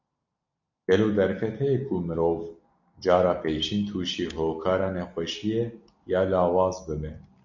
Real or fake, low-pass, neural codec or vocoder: real; 7.2 kHz; none